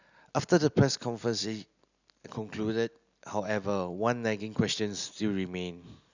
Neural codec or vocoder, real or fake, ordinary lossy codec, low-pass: none; real; none; 7.2 kHz